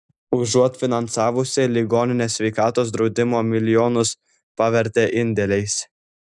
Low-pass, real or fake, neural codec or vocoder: 10.8 kHz; real; none